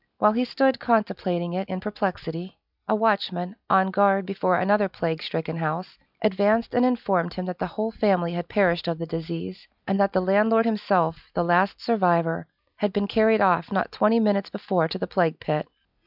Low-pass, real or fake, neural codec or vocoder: 5.4 kHz; real; none